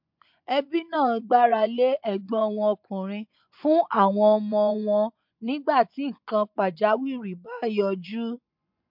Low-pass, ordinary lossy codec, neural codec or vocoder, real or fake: 5.4 kHz; MP3, 48 kbps; vocoder, 24 kHz, 100 mel bands, Vocos; fake